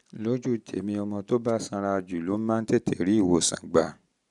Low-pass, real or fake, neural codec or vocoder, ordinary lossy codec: 10.8 kHz; real; none; none